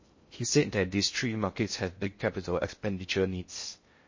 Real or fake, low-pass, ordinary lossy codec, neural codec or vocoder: fake; 7.2 kHz; MP3, 32 kbps; codec, 16 kHz in and 24 kHz out, 0.6 kbps, FocalCodec, streaming, 2048 codes